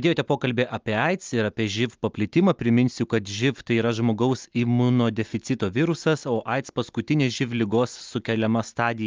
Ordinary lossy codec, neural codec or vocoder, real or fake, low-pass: Opus, 24 kbps; none; real; 7.2 kHz